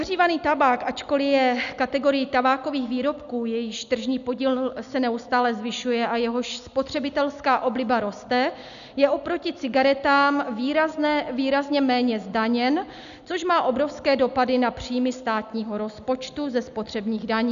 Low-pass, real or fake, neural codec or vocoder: 7.2 kHz; real; none